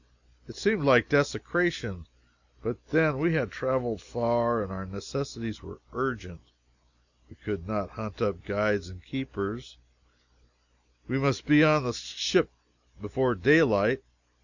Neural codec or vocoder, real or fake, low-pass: none; real; 7.2 kHz